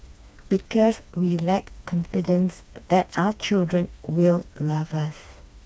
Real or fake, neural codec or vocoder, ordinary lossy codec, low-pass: fake; codec, 16 kHz, 2 kbps, FreqCodec, smaller model; none; none